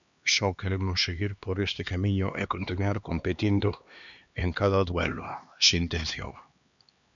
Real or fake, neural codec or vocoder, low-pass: fake; codec, 16 kHz, 2 kbps, X-Codec, HuBERT features, trained on LibriSpeech; 7.2 kHz